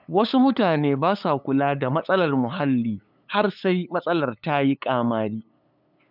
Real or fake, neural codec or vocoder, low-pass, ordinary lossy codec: fake; codec, 16 kHz, 8 kbps, FunCodec, trained on LibriTTS, 25 frames a second; 5.4 kHz; none